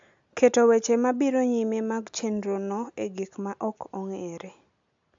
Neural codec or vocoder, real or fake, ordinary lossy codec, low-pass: none; real; AAC, 64 kbps; 7.2 kHz